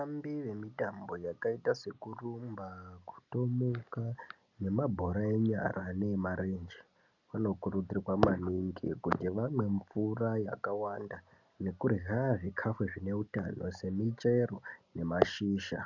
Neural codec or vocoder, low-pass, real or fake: none; 7.2 kHz; real